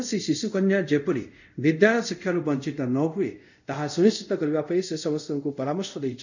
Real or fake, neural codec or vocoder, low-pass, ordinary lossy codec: fake; codec, 24 kHz, 0.5 kbps, DualCodec; 7.2 kHz; none